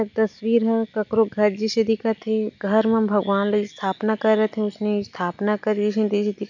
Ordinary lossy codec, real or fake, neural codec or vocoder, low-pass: none; real; none; 7.2 kHz